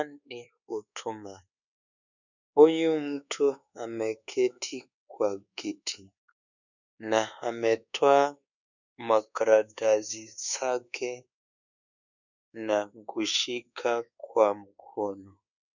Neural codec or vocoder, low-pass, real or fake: codec, 24 kHz, 1.2 kbps, DualCodec; 7.2 kHz; fake